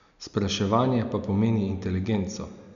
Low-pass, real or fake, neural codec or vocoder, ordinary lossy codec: 7.2 kHz; real; none; none